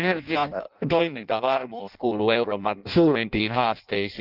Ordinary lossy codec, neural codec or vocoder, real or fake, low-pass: Opus, 24 kbps; codec, 16 kHz in and 24 kHz out, 0.6 kbps, FireRedTTS-2 codec; fake; 5.4 kHz